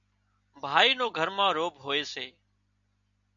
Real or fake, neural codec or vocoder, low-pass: real; none; 7.2 kHz